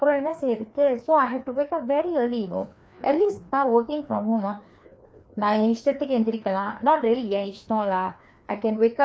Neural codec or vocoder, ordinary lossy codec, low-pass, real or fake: codec, 16 kHz, 2 kbps, FreqCodec, larger model; none; none; fake